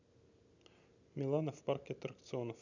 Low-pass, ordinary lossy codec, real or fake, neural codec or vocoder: 7.2 kHz; none; real; none